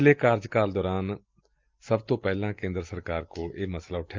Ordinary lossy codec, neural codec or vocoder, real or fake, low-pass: Opus, 24 kbps; none; real; 7.2 kHz